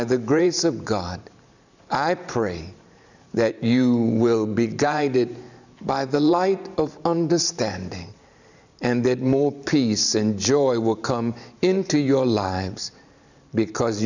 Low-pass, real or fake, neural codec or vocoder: 7.2 kHz; fake; vocoder, 44.1 kHz, 128 mel bands every 512 samples, BigVGAN v2